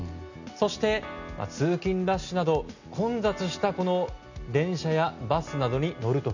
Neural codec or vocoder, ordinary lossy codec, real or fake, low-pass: none; none; real; 7.2 kHz